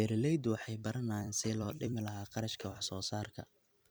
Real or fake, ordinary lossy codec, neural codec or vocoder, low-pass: fake; none; vocoder, 44.1 kHz, 128 mel bands every 256 samples, BigVGAN v2; none